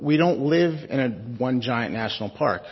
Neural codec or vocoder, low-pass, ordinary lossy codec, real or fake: none; 7.2 kHz; MP3, 24 kbps; real